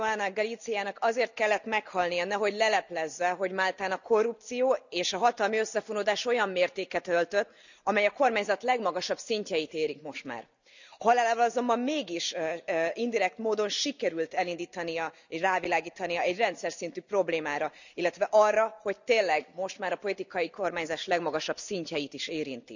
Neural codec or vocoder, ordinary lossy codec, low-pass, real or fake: none; none; 7.2 kHz; real